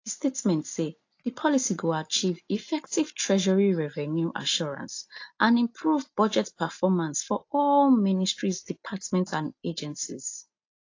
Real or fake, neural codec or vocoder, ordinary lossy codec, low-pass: real; none; AAC, 48 kbps; 7.2 kHz